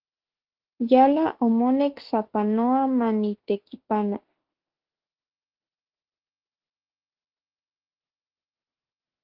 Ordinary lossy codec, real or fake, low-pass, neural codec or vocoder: Opus, 16 kbps; fake; 5.4 kHz; autoencoder, 48 kHz, 32 numbers a frame, DAC-VAE, trained on Japanese speech